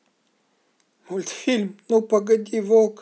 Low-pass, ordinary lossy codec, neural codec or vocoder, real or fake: none; none; none; real